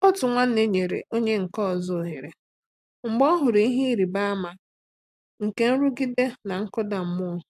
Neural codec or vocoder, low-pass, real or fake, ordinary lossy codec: none; 14.4 kHz; real; AAC, 96 kbps